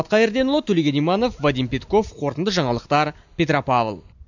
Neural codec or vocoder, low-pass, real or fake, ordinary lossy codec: none; 7.2 kHz; real; none